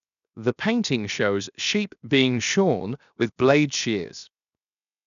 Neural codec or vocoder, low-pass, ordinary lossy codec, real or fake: codec, 16 kHz, 0.7 kbps, FocalCodec; 7.2 kHz; none; fake